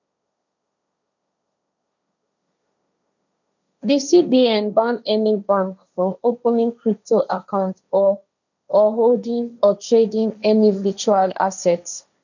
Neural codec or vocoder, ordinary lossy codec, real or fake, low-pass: codec, 16 kHz, 1.1 kbps, Voila-Tokenizer; none; fake; 7.2 kHz